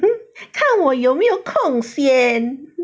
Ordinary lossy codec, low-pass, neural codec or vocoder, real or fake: none; none; none; real